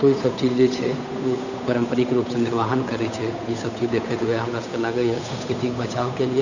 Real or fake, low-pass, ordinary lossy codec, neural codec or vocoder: fake; 7.2 kHz; none; codec, 16 kHz, 8 kbps, FunCodec, trained on Chinese and English, 25 frames a second